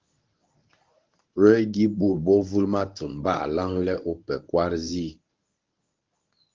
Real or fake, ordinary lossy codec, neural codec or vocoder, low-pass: fake; Opus, 32 kbps; codec, 24 kHz, 0.9 kbps, WavTokenizer, medium speech release version 1; 7.2 kHz